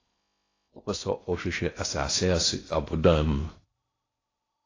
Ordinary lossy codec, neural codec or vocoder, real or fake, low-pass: AAC, 32 kbps; codec, 16 kHz in and 24 kHz out, 0.6 kbps, FocalCodec, streaming, 4096 codes; fake; 7.2 kHz